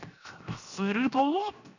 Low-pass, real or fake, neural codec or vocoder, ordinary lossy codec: 7.2 kHz; fake; codec, 16 kHz, 0.7 kbps, FocalCodec; none